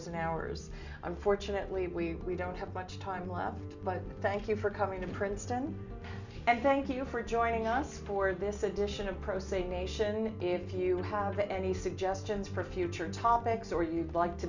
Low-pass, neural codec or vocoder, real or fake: 7.2 kHz; none; real